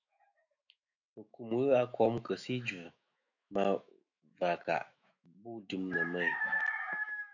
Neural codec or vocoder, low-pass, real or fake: autoencoder, 48 kHz, 128 numbers a frame, DAC-VAE, trained on Japanese speech; 7.2 kHz; fake